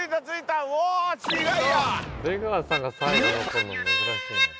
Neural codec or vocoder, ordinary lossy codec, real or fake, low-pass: none; none; real; none